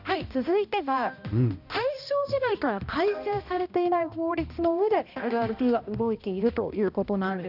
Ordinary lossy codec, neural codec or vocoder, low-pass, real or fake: none; codec, 16 kHz, 1 kbps, X-Codec, HuBERT features, trained on balanced general audio; 5.4 kHz; fake